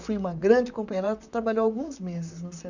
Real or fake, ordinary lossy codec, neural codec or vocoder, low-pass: fake; none; vocoder, 44.1 kHz, 128 mel bands, Pupu-Vocoder; 7.2 kHz